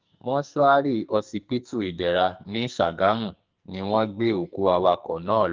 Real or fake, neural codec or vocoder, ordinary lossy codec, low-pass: fake; codec, 44.1 kHz, 2.6 kbps, SNAC; Opus, 32 kbps; 7.2 kHz